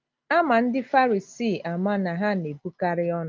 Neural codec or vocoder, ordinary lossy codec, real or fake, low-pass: none; Opus, 24 kbps; real; 7.2 kHz